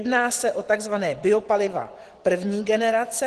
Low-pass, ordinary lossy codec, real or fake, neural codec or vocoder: 10.8 kHz; Opus, 16 kbps; fake; vocoder, 24 kHz, 100 mel bands, Vocos